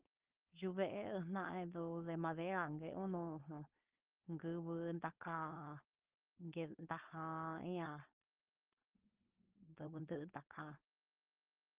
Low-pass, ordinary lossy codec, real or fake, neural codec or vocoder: 3.6 kHz; Opus, 32 kbps; fake; codec, 16 kHz, 4.8 kbps, FACodec